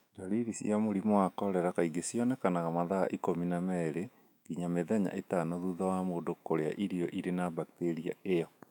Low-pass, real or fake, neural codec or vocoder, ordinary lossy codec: 19.8 kHz; fake; autoencoder, 48 kHz, 128 numbers a frame, DAC-VAE, trained on Japanese speech; none